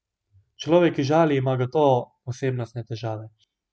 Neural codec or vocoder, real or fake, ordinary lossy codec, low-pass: none; real; none; none